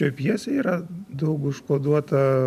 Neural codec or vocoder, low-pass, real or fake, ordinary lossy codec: none; 14.4 kHz; real; MP3, 96 kbps